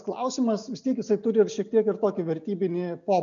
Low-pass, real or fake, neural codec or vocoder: 7.2 kHz; real; none